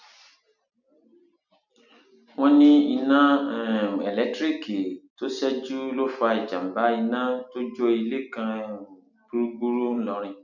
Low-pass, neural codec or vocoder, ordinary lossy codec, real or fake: 7.2 kHz; none; none; real